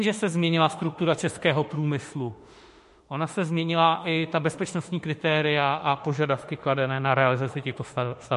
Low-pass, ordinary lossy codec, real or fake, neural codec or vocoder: 14.4 kHz; MP3, 48 kbps; fake; autoencoder, 48 kHz, 32 numbers a frame, DAC-VAE, trained on Japanese speech